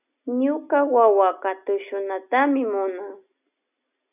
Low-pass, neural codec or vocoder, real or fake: 3.6 kHz; none; real